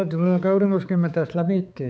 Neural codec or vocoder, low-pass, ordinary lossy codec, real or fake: codec, 16 kHz, 4 kbps, X-Codec, HuBERT features, trained on balanced general audio; none; none; fake